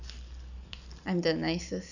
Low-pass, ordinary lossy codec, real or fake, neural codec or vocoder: 7.2 kHz; none; fake; vocoder, 44.1 kHz, 128 mel bands every 256 samples, BigVGAN v2